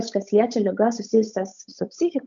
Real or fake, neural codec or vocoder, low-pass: fake; codec, 16 kHz, 8 kbps, FunCodec, trained on Chinese and English, 25 frames a second; 7.2 kHz